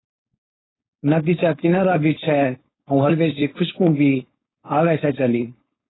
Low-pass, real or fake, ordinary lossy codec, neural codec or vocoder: 7.2 kHz; fake; AAC, 16 kbps; codec, 16 kHz, 4.8 kbps, FACodec